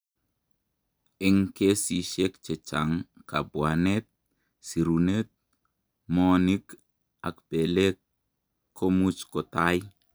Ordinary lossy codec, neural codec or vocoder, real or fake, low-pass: none; none; real; none